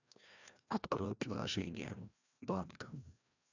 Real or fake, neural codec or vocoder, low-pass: fake; codec, 16 kHz, 1 kbps, FreqCodec, larger model; 7.2 kHz